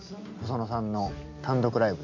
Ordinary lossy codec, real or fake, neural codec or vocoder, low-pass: MP3, 64 kbps; real; none; 7.2 kHz